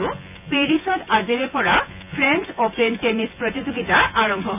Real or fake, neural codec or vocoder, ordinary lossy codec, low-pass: fake; vocoder, 24 kHz, 100 mel bands, Vocos; none; 3.6 kHz